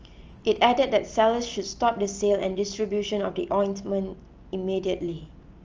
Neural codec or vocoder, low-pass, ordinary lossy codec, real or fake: none; 7.2 kHz; Opus, 24 kbps; real